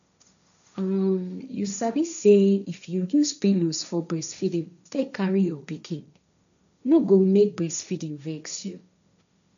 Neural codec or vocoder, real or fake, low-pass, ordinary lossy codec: codec, 16 kHz, 1.1 kbps, Voila-Tokenizer; fake; 7.2 kHz; none